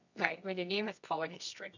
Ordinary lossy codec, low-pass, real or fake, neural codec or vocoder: none; 7.2 kHz; fake; codec, 24 kHz, 0.9 kbps, WavTokenizer, medium music audio release